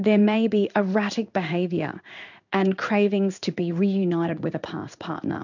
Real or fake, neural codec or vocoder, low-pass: fake; codec, 16 kHz in and 24 kHz out, 1 kbps, XY-Tokenizer; 7.2 kHz